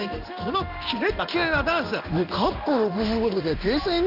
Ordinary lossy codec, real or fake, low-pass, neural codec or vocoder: none; fake; 5.4 kHz; codec, 16 kHz in and 24 kHz out, 1 kbps, XY-Tokenizer